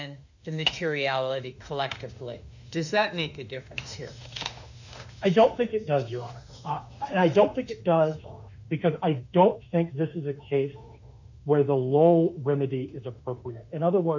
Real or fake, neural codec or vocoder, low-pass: fake; autoencoder, 48 kHz, 32 numbers a frame, DAC-VAE, trained on Japanese speech; 7.2 kHz